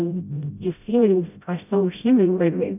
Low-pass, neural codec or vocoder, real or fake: 3.6 kHz; codec, 16 kHz, 0.5 kbps, FreqCodec, smaller model; fake